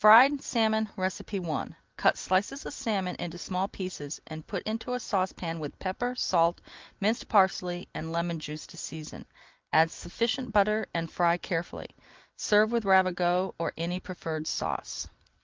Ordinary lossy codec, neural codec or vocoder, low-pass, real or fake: Opus, 24 kbps; none; 7.2 kHz; real